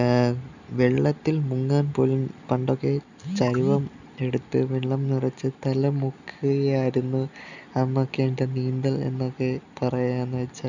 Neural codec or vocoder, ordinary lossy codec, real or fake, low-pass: none; none; real; 7.2 kHz